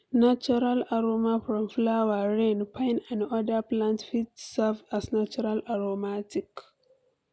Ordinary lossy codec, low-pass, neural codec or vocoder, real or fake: none; none; none; real